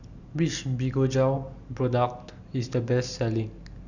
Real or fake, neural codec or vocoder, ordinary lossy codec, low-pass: real; none; none; 7.2 kHz